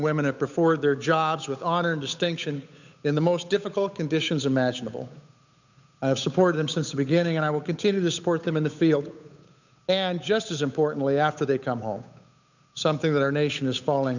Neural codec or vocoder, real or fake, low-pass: codec, 16 kHz, 8 kbps, FunCodec, trained on Chinese and English, 25 frames a second; fake; 7.2 kHz